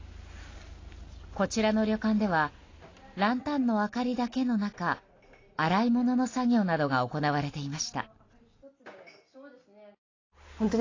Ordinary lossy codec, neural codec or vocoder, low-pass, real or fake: AAC, 32 kbps; none; 7.2 kHz; real